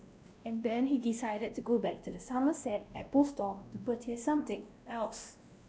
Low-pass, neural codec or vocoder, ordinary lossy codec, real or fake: none; codec, 16 kHz, 1 kbps, X-Codec, WavLM features, trained on Multilingual LibriSpeech; none; fake